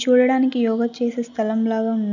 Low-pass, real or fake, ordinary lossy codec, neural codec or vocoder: 7.2 kHz; real; none; none